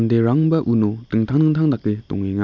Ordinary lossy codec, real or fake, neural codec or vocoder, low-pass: none; real; none; 7.2 kHz